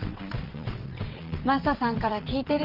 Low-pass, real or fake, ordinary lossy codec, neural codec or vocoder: 5.4 kHz; fake; Opus, 32 kbps; vocoder, 22.05 kHz, 80 mel bands, WaveNeXt